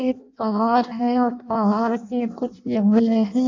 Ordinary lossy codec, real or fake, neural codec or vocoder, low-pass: AAC, 48 kbps; fake; codec, 16 kHz in and 24 kHz out, 0.6 kbps, FireRedTTS-2 codec; 7.2 kHz